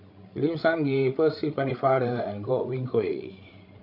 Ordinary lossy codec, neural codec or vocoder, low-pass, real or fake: none; codec, 16 kHz, 16 kbps, FreqCodec, larger model; 5.4 kHz; fake